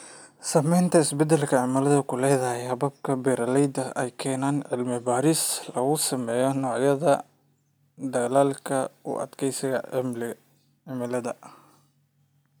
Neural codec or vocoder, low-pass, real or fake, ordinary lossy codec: none; none; real; none